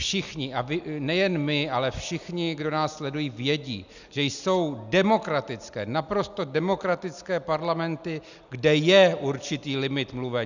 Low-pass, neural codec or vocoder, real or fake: 7.2 kHz; none; real